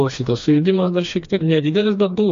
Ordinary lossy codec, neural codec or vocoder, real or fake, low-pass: MP3, 48 kbps; codec, 16 kHz, 2 kbps, FreqCodec, smaller model; fake; 7.2 kHz